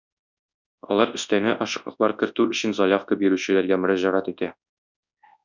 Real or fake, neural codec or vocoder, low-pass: fake; codec, 24 kHz, 0.9 kbps, WavTokenizer, large speech release; 7.2 kHz